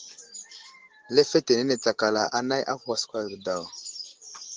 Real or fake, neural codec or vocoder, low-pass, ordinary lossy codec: real; none; 7.2 kHz; Opus, 16 kbps